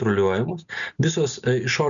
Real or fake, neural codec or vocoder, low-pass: real; none; 7.2 kHz